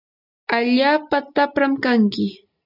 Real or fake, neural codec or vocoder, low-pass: real; none; 5.4 kHz